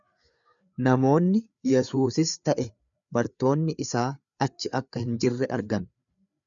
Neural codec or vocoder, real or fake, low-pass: codec, 16 kHz, 4 kbps, FreqCodec, larger model; fake; 7.2 kHz